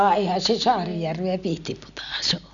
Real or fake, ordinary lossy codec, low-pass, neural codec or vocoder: real; none; 7.2 kHz; none